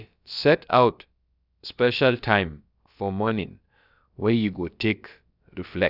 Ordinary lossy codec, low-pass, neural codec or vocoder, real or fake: none; 5.4 kHz; codec, 16 kHz, about 1 kbps, DyCAST, with the encoder's durations; fake